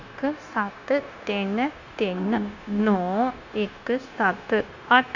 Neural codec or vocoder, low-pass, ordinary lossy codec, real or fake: codec, 16 kHz, 0.9 kbps, LongCat-Audio-Codec; 7.2 kHz; none; fake